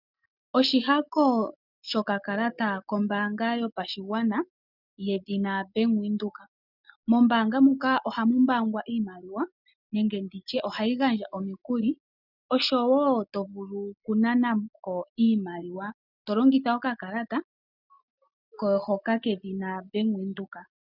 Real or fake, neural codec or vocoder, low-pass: real; none; 5.4 kHz